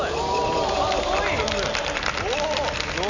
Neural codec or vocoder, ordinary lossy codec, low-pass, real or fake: none; none; 7.2 kHz; real